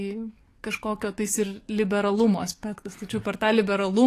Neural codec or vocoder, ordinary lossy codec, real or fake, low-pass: codec, 44.1 kHz, 7.8 kbps, DAC; AAC, 48 kbps; fake; 14.4 kHz